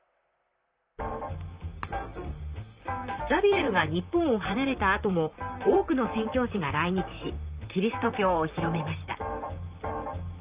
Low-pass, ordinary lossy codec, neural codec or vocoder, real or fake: 3.6 kHz; Opus, 24 kbps; vocoder, 44.1 kHz, 128 mel bands, Pupu-Vocoder; fake